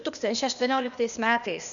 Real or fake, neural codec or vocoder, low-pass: fake; codec, 16 kHz, 0.8 kbps, ZipCodec; 7.2 kHz